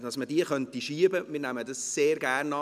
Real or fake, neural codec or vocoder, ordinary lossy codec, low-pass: real; none; none; 14.4 kHz